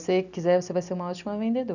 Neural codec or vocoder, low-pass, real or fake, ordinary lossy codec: none; 7.2 kHz; real; none